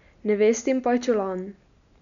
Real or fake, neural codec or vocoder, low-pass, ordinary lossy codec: real; none; 7.2 kHz; none